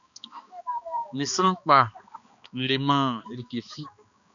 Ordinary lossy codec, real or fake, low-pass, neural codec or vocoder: MP3, 96 kbps; fake; 7.2 kHz; codec, 16 kHz, 2 kbps, X-Codec, HuBERT features, trained on balanced general audio